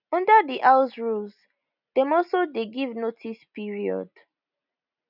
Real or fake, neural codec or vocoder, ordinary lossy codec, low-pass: real; none; none; 5.4 kHz